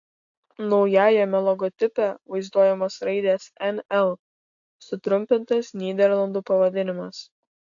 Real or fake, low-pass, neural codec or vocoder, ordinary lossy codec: real; 7.2 kHz; none; AAC, 64 kbps